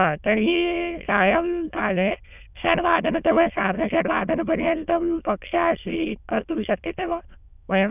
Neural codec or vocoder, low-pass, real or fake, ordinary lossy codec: autoencoder, 22.05 kHz, a latent of 192 numbers a frame, VITS, trained on many speakers; 3.6 kHz; fake; none